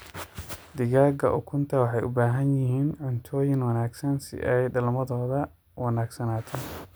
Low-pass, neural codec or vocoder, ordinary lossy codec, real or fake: none; none; none; real